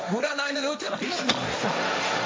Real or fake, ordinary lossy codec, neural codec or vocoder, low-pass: fake; none; codec, 16 kHz, 1.1 kbps, Voila-Tokenizer; none